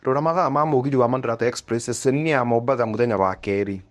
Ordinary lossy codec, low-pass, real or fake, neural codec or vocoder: none; none; fake; codec, 24 kHz, 0.9 kbps, WavTokenizer, medium speech release version 1